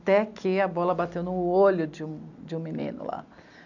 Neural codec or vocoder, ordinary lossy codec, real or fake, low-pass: none; none; real; 7.2 kHz